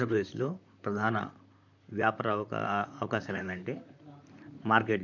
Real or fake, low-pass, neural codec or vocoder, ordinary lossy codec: fake; 7.2 kHz; vocoder, 44.1 kHz, 128 mel bands, Pupu-Vocoder; none